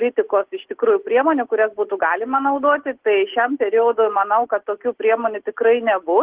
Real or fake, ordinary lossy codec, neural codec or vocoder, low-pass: real; Opus, 16 kbps; none; 3.6 kHz